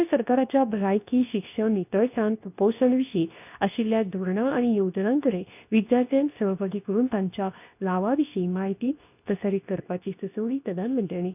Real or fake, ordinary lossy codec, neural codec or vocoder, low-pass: fake; none; codec, 24 kHz, 0.9 kbps, WavTokenizer, medium speech release version 2; 3.6 kHz